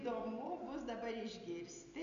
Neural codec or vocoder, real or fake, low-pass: none; real; 7.2 kHz